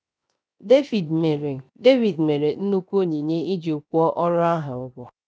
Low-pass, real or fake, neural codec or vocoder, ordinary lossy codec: none; fake; codec, 16 kHz, 0.7 kbps, FocalCodec; none